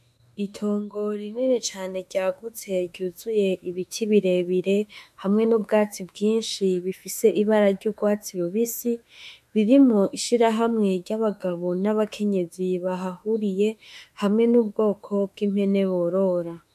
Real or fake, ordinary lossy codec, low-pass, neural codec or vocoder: fake; MP3, 96 kbps; 14.4 kHz; autoencoder, 48 kHz, 32 numbers a frame, DAC-VAE, trained on Japanese speech